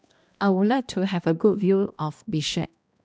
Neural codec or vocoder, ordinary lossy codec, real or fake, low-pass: codec, 16 kHz, 1 kbps, X-Codec, HuBERT features, trained on balanced general audio; none; fake; none